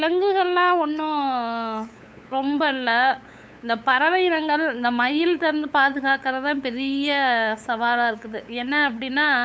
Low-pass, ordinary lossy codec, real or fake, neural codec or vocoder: none; none; fake; codec, 16 kHz, 8 kbps, FunCodec, trained on LibriTTS, 25 frames a second